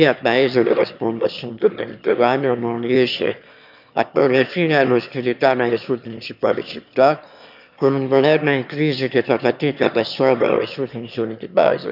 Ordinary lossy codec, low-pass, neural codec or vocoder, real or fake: none; 5.4 kHz; autoencoder, 22.05 kHz, a latent of 192 numbers a frame, VITS, trained on one speaker; fake